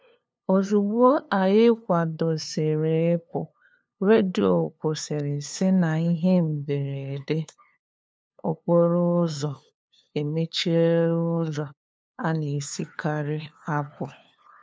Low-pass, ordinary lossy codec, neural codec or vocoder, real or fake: none; none; codec, 16 kHz, 2 kbps, FunCodec, trained on LibriTTS, 25 frames a second; fake